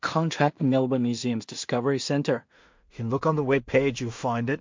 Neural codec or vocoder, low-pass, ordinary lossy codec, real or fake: codec, 16 kHz in and 24 kHz out, 0.4 kbps, LongCat-Audio-Codec, two codebook decoder; 7.2 kHz; MP3, 48 kbps; fake